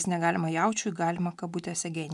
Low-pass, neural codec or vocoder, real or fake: 10.8 kHz; none; real